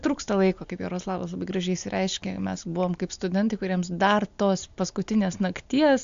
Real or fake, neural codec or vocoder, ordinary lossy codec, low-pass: real; none; AAC, 64 kbps; 7.2 kHz